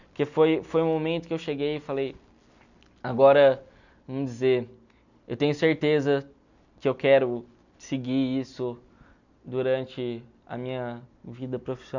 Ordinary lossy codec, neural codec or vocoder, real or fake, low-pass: none; none; real; 7.2 kHz